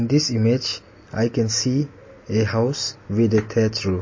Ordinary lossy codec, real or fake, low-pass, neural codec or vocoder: MP3, 32 kbps; real; 7.2 kHz; none